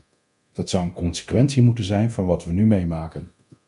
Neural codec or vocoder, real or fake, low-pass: codec, 24 kHz, 0.9 kbps, DualCodec; fake; 10.8 kHz